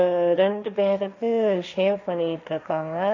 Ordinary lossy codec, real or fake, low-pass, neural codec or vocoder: none; fake; 7.2 kHz; codec, 16 kHz, 1.1 kbps, Voila-Tokenizer